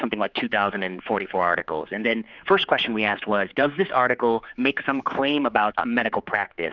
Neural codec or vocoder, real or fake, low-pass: codec, 16 kHz, 4 kbps, X-Codec, HuBERT features, trained on general audio; fake; 7.2 kHz